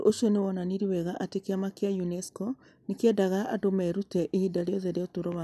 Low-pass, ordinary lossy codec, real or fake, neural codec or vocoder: 14.4 kHz; none; real; none